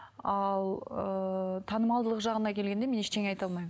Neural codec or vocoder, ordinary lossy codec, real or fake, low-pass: none; none; real; none